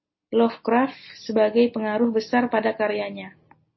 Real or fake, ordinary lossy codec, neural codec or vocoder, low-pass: real; MP3, 24 kbps; none; 7.2 kHz